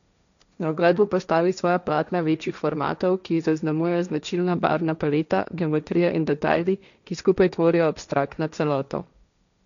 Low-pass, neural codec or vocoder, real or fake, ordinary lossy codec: 7.2 kHz; codec, 16 kHz, 1.1 kbps, Voila-Tokenizer; fake; none